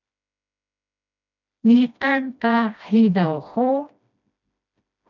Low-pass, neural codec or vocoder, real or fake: 7.2 kHz; codec, 16 kHz, 1 kbps, FreqCodec, smaller model; fake